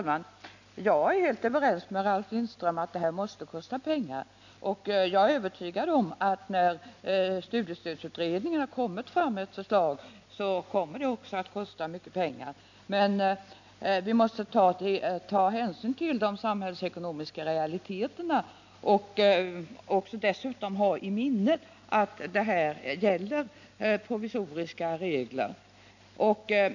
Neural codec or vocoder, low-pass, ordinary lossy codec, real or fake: none; 7.2 kHz; none; real